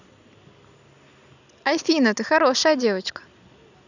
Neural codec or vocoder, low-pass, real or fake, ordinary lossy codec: vocoder, 44.1 kHz, 128 mel bands every 256 samples, BigVGAN v2; 7.2 kHz; fake; none